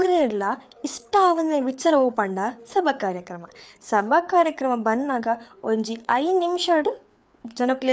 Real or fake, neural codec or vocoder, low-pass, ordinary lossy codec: fake; codec, 16 kHz, 4 kbps, FreqCodec, larger model; none; none